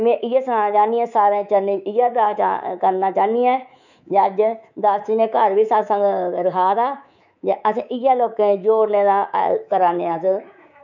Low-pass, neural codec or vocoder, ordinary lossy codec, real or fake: 7.2 kHz; codec, 24 kHz, 3.1 kbps, DualCodec; none; fake